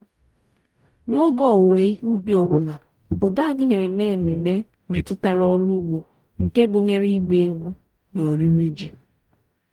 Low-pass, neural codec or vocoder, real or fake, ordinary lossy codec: 19.8 kHz; codec, 44.1 kHz, 0.9 kbps, DAC; fake; Opus, 32 kbps